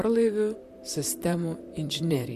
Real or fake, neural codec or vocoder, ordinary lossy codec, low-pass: fake; vocoder, 44.1 kHz, 128 mel bands, Pupu-Vocoder; Opus, 64 kbps; 14.4 kHz